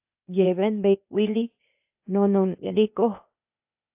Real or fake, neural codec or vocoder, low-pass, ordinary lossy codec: fake; codec, 16 kHz, 0.8 kbps, ZipCodec; 3.6 kHz; AAC, 32 kbps